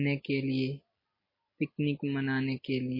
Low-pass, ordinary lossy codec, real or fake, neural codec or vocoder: 5.4 kHz; MP3, 24 kbps; real; none